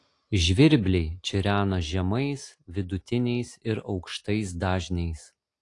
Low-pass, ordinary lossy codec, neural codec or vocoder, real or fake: 10.8 kHz; AAC, 48 kbps; none; real